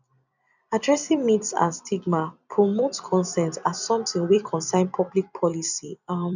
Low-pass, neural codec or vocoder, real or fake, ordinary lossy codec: 7.2 kHz; vocoder, 44.1 kHz, 128 mel bands every 256 samples, BigVGAN v2; fake; none